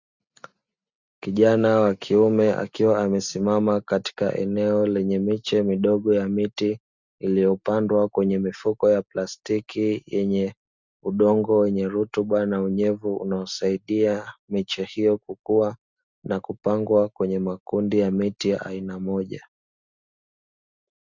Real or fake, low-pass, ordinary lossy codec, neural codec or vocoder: real; 7.2 kHz; Opus, 64 kbps; none